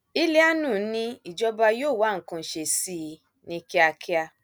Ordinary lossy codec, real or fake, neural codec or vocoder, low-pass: none; real; none; none